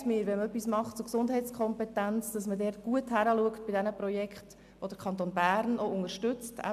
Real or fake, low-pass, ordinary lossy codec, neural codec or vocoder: real; 14.4 kHz; AAC, 96 kbps; none